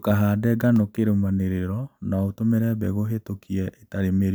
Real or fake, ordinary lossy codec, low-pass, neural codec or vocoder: real; none; none; none